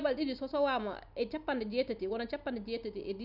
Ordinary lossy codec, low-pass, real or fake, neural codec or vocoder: none; 5.4 kHz; real; none